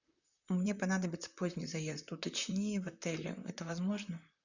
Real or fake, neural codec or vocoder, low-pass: fake; vocoder, 44.1 kHz, 128 mel bands, Pupu-Vocoder; 7.2 kHz